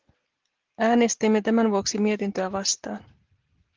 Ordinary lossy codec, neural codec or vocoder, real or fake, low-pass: Opus, 16 kbps; none; real; 7.2 kHz